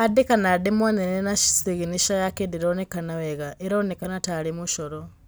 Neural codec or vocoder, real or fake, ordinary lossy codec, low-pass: none; real; none; none